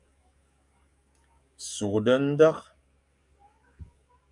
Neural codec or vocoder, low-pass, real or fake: codec, 44.1 kHz, 7.8 kbps, DAC; 10.8 kHz; fake